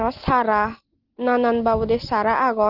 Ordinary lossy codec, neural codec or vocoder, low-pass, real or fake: Opus, 16 kbps; none; 5.4 kHz; real